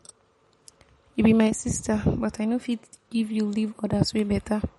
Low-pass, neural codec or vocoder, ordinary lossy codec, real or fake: 19.8 kHz; none; MP3, 48 kbps; real